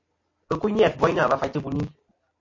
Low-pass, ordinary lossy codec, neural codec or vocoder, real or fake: 7.2 kHz; MP3, 32 kbps; none; real